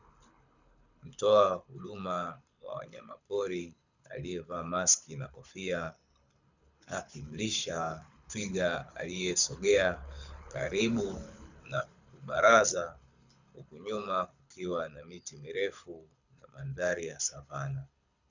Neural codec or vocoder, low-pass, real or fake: codec, 24 kHz, 6 kbps, HILCodec; 7.2 kHz; fake